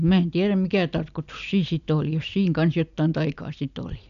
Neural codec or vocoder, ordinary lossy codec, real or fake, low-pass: none; none; real; 7.2 kHz